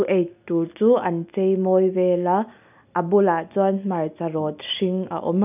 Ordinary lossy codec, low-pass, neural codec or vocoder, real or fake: none; 3.6 kHz; none; real